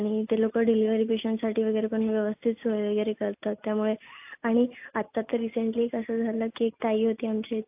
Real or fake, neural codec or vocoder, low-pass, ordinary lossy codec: real; none; 3.6 kHz; AAC, 32 kbps